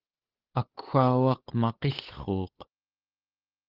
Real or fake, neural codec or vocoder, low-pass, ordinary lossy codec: fake; codec, 16 kHz, 8 kbps, FunCodec, trained on Chinese and English, 25 frames a second; 5.4 kHz; Opus, 16 kbps